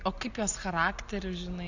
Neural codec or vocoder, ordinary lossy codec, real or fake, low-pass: none; AAC, 48 kbps; real; 7.2 kHz